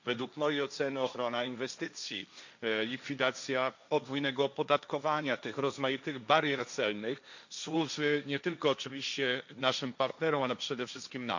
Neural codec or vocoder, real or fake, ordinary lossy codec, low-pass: codec, 16 kHz, 1.1 kbps, Voila-Tokenizer; fake; none; 7.2 kHz